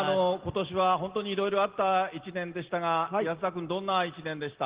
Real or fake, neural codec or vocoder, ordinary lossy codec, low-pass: real; none; Opus, 16 kbps; 3.6 kHz